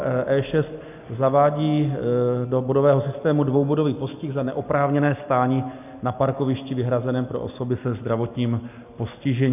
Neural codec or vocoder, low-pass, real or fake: none; 3.6 kHz; real